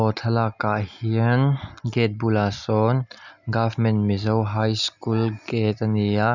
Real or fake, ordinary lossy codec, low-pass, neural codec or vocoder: real; none; 7.2 kHz; none